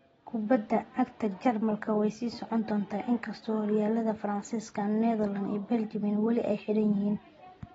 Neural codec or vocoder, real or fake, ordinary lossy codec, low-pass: none; real; AAC, 24 kbps; 19.8 kHz